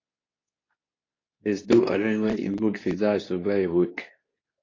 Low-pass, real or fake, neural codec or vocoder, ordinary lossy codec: 7.2 kHz; fake; codec, 24 kHz, 0.9 kbps, WavTokenizer, medium speech release version 2; AAC, 32 kbps